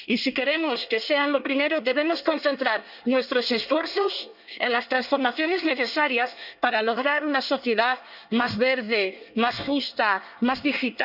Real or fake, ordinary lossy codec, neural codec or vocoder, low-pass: fake; none; codec, 24 kHz, 1 kbps, SNAC; 5.4 kHz